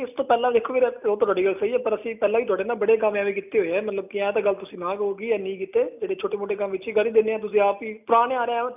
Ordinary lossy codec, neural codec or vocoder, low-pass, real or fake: none; none; 3.6 kHz; real